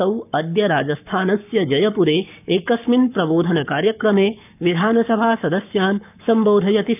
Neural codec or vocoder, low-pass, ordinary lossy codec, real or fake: codec, 44.1 kHz, 7.8 kbps, Pupu-Codec; 3.6 kHz; none; fake